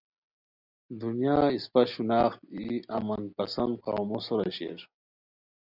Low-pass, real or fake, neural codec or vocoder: 5.4 kHz; real; none